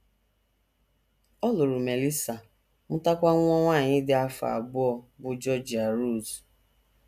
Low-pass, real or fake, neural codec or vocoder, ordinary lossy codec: 14.4 kHz; real; none; none